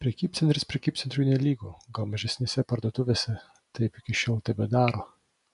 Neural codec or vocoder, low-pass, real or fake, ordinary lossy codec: none; 10.8 kHz; real; AAC, 96 kbps